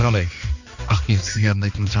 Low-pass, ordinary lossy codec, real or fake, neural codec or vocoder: 7.2 kHz; none; fake; codec, 16 kHz, 2 kbps, X-Codec, HuBERT features, trained on balanced general audio